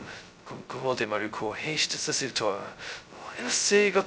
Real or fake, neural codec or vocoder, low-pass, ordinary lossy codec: fake; codec, 16 kHz, 0.2 kbps, FocalCodec; none; none